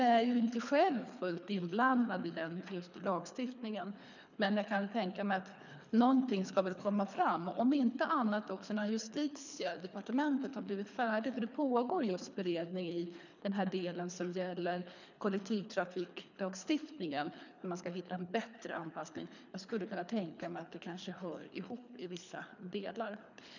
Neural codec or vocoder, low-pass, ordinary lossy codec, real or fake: codec, 24 kHz, 3 kbps, HILCodec; 7.2 kHz; none; fake